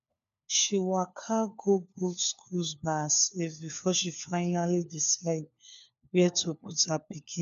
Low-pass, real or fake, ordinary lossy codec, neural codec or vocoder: 7.2 kHz; fake; none; codec, 16 kHz, 4 kbps, FunCodec, trained on LibriTTS, 50 frames a second